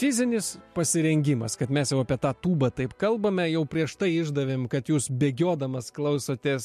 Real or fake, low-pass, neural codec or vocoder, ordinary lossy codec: real; 14.4 kHz; none; MP3, 64 kbps